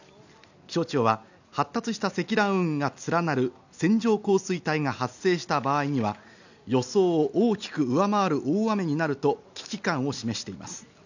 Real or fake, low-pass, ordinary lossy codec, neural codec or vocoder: real; 7.2 kHz; none; none